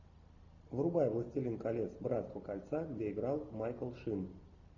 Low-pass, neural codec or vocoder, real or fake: 7.2 kHz; none; real